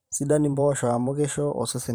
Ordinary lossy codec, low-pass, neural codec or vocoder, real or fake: none; none; vocoder, 44.1 kHz, 128 mel bands every 512 samples, BigVGAN v2; fake